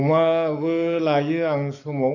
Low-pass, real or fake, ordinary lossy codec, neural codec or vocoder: 7.2 kHz; fake; none; codec, 44.1 kHz, 7.8 kbps, DAC